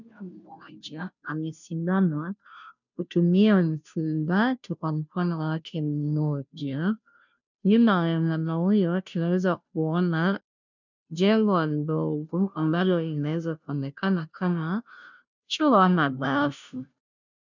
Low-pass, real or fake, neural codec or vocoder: 7.2 kHz; fake; codec, 16 kHz, 0.5 kbps, FunCodec, trained on Chinese and English, 25 frames a second